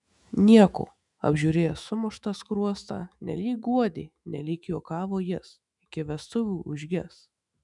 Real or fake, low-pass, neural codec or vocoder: fake; 10.8 kHz; autoencoder, 48 kHz, 128 numbers a frame, DAC-VAE, trained on Japanese speech